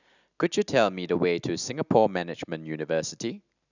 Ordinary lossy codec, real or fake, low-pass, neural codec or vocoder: none; real; 7.2 kHz; none